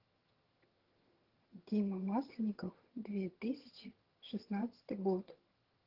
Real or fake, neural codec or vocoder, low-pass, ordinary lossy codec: fake; vocoder, 22.05 kHz, 80 mel bands, HiFi-GAN; 5.4 kHz; Opus, 16 kbps